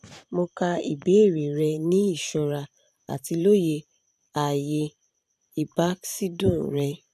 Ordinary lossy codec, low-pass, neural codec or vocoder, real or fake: none; 14.4 kHz; vocoder, 44.1 kHz, 128 mel bands every 512 samples, BigVGAN v2; fake